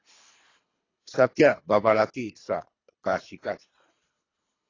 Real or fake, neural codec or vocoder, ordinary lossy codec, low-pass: fake; codec, 24 kHz, 3 kbps, HILCodec; AAC, 32 kbps; 7.2 kHz